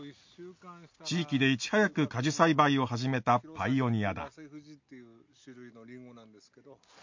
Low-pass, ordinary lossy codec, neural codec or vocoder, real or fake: 7.2 kHz; MP3, 48 kbps; none; real